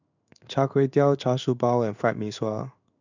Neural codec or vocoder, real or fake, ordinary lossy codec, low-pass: codec, 16 kHz in and 24 kHz out, 1 kbps, XY-Tokenizer; fake; none; 7.2 kHz